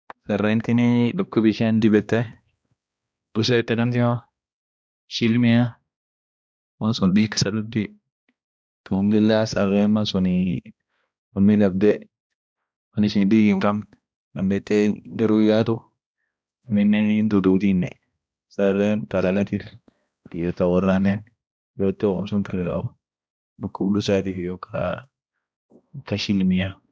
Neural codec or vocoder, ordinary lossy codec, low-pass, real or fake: codec, 16 kHz, 1 kbps, X-Codec, HuBERT features, trained on balanced general audio; none; none; fake